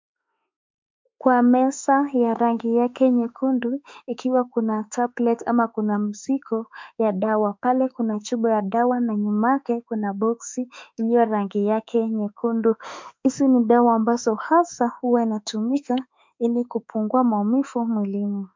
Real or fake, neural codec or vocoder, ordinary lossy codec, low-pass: fake; autoencoder, 48 kHz, 32 numbers a frame, DAC-VAE, trained on Japanese speech; MP3, 64 kbps; 7.2 kHz